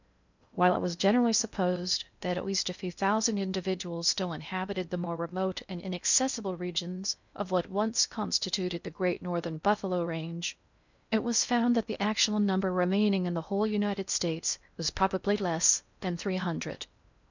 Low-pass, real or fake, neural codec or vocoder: 7.2 kHz; fake; codec, 16 kHz in and 24 kHz out, 0.6 kbps, FocalCodec, streaming, 2048 codes